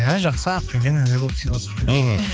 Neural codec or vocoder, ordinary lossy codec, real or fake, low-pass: codec, 16 kHz, 2 kbps, X-Codec, HuBERT features, trained on balanced general audio; none; fake; none